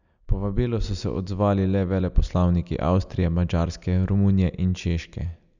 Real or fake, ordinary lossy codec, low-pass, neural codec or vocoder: real; none; 7.2 kHz; none